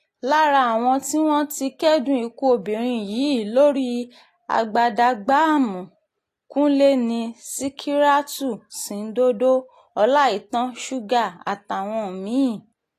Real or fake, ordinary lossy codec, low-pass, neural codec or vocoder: real; AAC, 48 kbps; 14.4 kHz; none